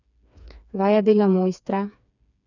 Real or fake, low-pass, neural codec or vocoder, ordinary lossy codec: fake; 7.2 kHz; codec, 16 kHz, 4 kbps, FreqCodec, smaller model; none